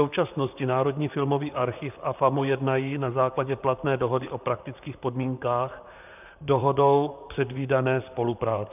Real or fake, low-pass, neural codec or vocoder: fake; 3.6 kHz; vocoder, 44.1 kHz, 128 mel bands, Pupu-Vocoder